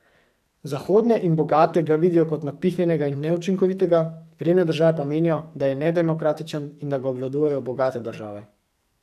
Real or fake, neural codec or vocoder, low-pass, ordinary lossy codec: fake; codec, 44.1 kHz, 2.6 kbps, SNAC; 14.4 kHz; none